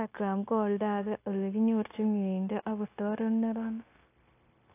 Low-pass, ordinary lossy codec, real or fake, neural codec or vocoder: 3.6 kHz; AAC, 24 kbps; fake; codec, 16 kHz, 0.9 kbps, LongCat-Audio-Codec